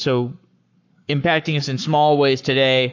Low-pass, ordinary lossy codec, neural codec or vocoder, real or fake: 7.2 kHz; AAC, 48 kbps; codec, 44.1 kHz, 7.8 kbps, Pupu-Codec; fake